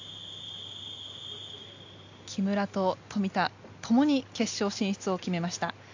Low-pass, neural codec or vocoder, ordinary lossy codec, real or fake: 7.2 kHz; none; AAC, 48 kbps; real